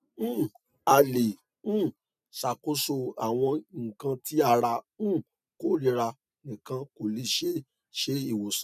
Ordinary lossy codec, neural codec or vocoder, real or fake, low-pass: none; none; real; 14.4 kHz